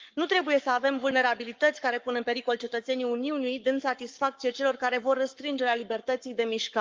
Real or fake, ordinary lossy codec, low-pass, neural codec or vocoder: fake; Opus, 24 kbps; 7.2 kHz; codec, 44.1 kHz, 7.8 kbps, Pupu-Codec